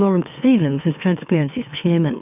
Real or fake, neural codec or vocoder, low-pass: fake; autoencoder, 44.1 kHz, a latent of 192 numbers a frame, MeloTTS; 3.6 kHz